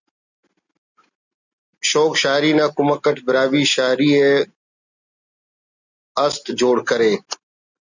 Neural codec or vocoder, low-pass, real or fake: none; 7.2 kHz; real